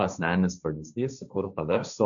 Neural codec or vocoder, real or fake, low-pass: codec, 16 kHz, 1.1 kbps, Voila-Tokenizer; fake; 7.2 kHz